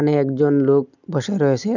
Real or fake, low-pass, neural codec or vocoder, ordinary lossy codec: real; 7.2 kHz; none; none